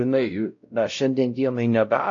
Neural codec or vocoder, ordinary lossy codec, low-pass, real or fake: codec, 16 kHz, 0.5 kbps, X-Codec, WavLM features, trained on Multilingual LibriSpeech; AAC, 48 kbps; 7.2 kHz; fake